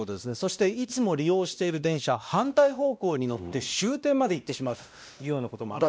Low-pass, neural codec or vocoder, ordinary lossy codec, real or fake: none; codec, 16 kHz, 1 kbps, X-Codec, WavLM features, trained on Multilingual LibriSpeech; none; fake